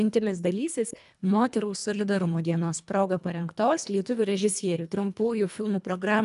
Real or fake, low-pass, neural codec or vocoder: fake; 10.8 kHz; codec, 24 kHz, 1.5 kbps, HILCodec